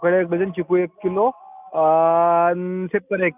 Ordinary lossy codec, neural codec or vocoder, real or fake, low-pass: none; none; real; 3.6 kHz